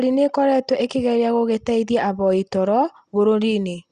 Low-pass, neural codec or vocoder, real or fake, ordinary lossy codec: 9.9 kHz; none; real; Opus, 64 kbps